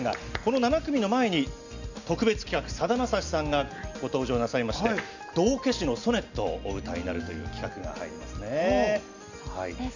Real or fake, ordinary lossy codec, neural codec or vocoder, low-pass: real; none; none; 7.2 kHz